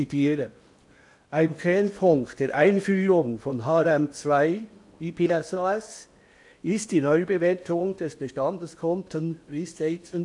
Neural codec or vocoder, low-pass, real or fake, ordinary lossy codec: codec, 16 kHz in and 24 kHz out, 0.8 kbps, FocalCodec, streaming, 65536 codes; 10.8 kHz; fake; AAC, 64 kbps